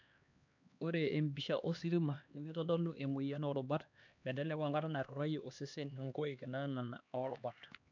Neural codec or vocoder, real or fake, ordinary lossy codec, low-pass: codec, 16 kHz, 2 kbps, X-Codec, HuBERT features, trained on LibriSpeech; fake; none; 7.2 kHz